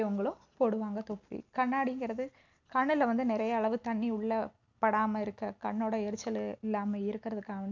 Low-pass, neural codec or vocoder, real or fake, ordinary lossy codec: 7.2 kHz; none; real; none